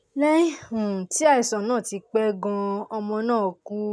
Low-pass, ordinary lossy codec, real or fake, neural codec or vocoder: none; none; real; none